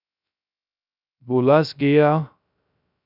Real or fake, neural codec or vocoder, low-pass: fake; codec, 16 kHz, 0.3 kbps, FocalCodec; 5.4 kHz